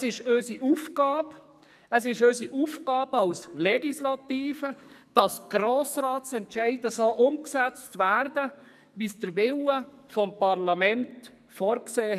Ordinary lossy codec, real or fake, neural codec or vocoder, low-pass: none; fake; codec, 44.1 kHz, 2.6 kbps, SNAC; 14.4 kHz